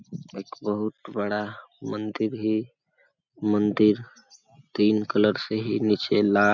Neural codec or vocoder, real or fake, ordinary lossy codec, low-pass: none; real; none; 7.2 kHz